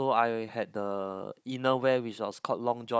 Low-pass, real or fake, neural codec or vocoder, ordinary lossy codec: none; fake; codec, 16 kHz, 16 kbps, FunCodec, trained on Chinese and English, 50 frames a second; none